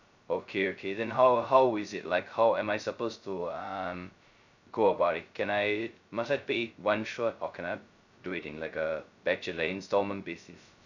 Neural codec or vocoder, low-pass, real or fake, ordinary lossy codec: codec, 16 kHz, 0.2 kbps, FocalCodec; 7.2 kHz; fake; none